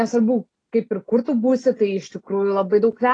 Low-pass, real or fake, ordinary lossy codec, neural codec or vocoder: 9.9 kHz; real; AAC, 32 kbps; none